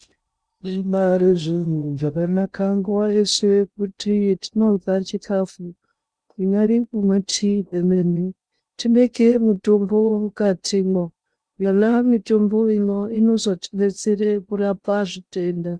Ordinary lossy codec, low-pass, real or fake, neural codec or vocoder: Opus, 64 kbps; 9.9 kHz; fake; codec, 16 kHz in and 24 kHz out, 0.6 kbps, FocalCodec, streaming, 2048 codes